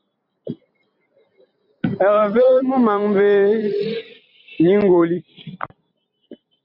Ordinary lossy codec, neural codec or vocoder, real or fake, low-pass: AAC, 48 kbps; vocoder, 44.1 kHz, 128 mel bands every 256 samples, BigVGAN v2; fake; 5.4 kHz